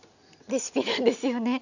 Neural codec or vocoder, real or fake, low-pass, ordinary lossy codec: none; real; 7.2 kHz; none